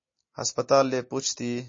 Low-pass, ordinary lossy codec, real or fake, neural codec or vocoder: 7.2 kHz; MP3, 32 kbps; real; none